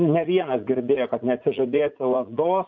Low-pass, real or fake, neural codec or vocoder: 7.2 kHz; fake; vocoder, 44.1 kHz, 80 mel bands, Vocos